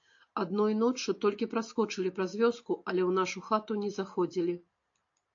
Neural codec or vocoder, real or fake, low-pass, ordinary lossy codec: none; real; 7.2 kHz; AAC, 48 kbps